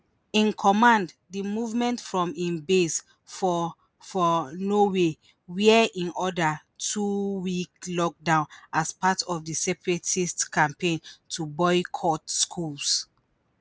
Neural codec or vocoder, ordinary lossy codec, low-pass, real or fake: none; none; none; real